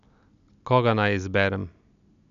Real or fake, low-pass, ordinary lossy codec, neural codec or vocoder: real; 7.2 kHz; none; none